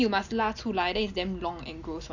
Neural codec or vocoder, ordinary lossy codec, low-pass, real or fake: none; none; 7.2 kHz; real